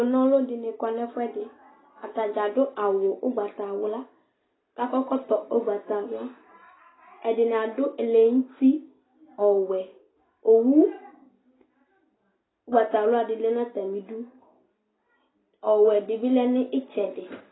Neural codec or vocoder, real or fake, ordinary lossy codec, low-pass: none; real; AAC, 16 kbps; 7.2 kHz